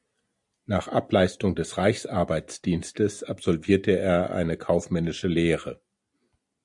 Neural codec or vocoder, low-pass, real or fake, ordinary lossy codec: none; 10.8 kHz; real; MP3, 96 kbps